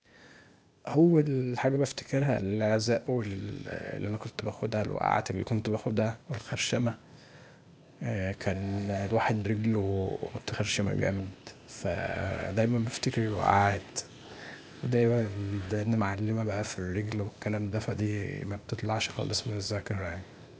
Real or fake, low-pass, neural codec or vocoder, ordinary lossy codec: fake; none; codec, 16 kHz, 0.8 kbps, ZipCodec; none